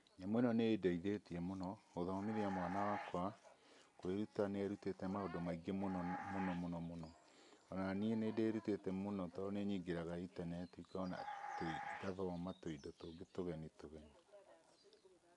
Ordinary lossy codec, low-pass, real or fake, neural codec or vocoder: none; 10.8 kHz; real; none